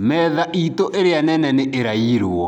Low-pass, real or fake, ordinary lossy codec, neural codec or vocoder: 19.8 kHz; real; none; none